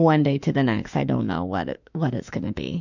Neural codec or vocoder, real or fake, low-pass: autoencoder, 48 kHz, 32 numbers a frame, DAC-VAE, trained on Japanese speech; fake; 7.2 kHz